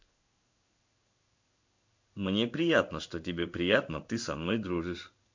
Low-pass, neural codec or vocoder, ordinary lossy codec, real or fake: 7.2 kHz; codec, 16 kHz in and 24 kHz out, 1 kbps, XY-Tokenizer; MP3, 64 kbps; fake